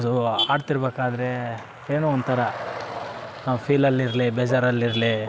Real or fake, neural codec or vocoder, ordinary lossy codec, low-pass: real; none; none; none